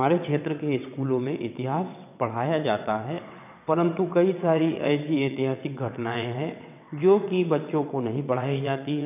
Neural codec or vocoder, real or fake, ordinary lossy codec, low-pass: vocoder, 22.05 kHz, 80 mel bands, WaveNeXt; fake; none; 3.6 kHz